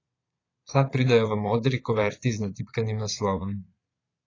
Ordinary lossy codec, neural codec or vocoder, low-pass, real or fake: AAC, 32 kbps; vocoder, 22.05 kHz, 80 mel bands, Vocos; 7.2 kHz; fake